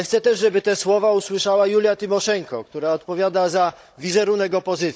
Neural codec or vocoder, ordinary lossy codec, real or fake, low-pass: codec, 16 kHz, 16 kbps, FunCodec, trained on Chinese and English, 50 frames a second; none; fake; none